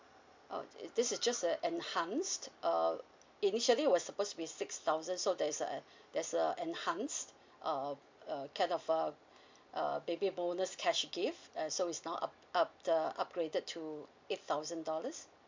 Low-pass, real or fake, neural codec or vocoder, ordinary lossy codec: 7.2 kHz; real; none; MP3, 64 kbps